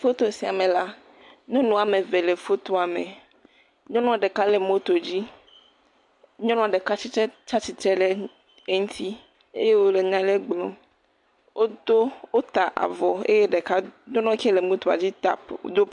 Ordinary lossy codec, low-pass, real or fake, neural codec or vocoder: MP3, 64 kbps; 10.8 kHz; real; none